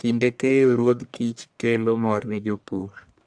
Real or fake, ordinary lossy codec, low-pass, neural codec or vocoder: fake; AAC, 64 kbps; 9.9 kHz; codec, 44.1 kHz, 1.7 kbps, Pupu-Codec